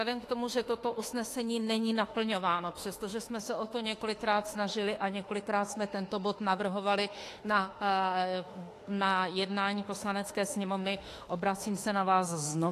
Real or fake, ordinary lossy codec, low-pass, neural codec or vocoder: fake; AAC, 48 kbps; 14.4 kHz; autoencoder, 48 kHz, 32 numbers a frame, DAC-VAE, trained on Japanese speech